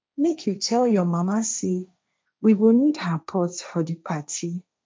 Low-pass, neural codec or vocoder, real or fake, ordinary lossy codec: none; codec, 16 kHz, 1.1 kbps, Voila-Tokenizer; fake; none